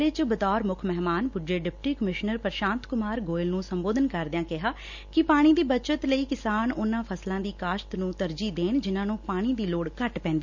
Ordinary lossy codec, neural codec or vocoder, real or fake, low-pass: none; none; real; 7.2 kHz